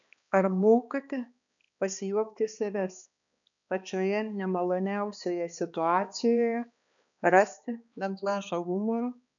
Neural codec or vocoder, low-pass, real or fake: codec, 16 kHz, 2 kbps, X-Codec, HuBERT features, trained on balanced general audio; 7.2 kHz; fake